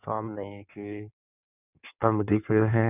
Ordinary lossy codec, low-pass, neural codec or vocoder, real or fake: none; 3.6 kHz; codec, 16 kHz in and 24 kHz out, 1.1 kbps, FireRedTTS-2 codec; fake